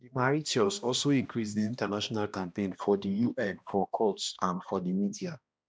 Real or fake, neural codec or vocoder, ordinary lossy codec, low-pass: fake; codec, 16 kHz, 1 kbps, X-Codec, HuBERT features, trained on balanced general audio; none; none